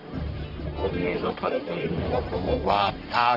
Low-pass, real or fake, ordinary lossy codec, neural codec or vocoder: 5.4 kHz; fake; none; codec, 44.1 kHz, 1.7 kbps, Pupu-Codec